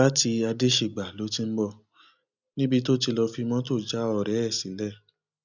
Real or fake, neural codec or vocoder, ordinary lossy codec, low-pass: real; none; none; 7.2 kHz